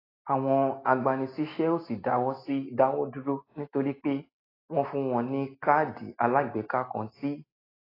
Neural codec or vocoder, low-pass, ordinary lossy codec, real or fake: none; 5.4 kHz; AAC, 24 kbps; real